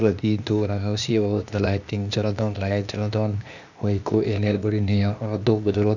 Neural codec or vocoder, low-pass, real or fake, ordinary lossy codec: codec, 16 kHz, 0.8 kbps, ZipCodec; 7.2 kHz; fake; none